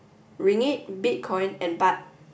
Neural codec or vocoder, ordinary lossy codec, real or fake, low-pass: none; none; real; none